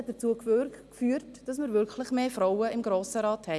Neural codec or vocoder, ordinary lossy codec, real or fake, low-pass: none; none; real; none